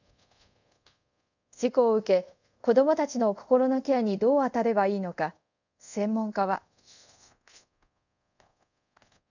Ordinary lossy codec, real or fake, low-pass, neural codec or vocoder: none; fake; 7.2 kHz; codec, 24 kHz, 0.5 kbps, DualCodec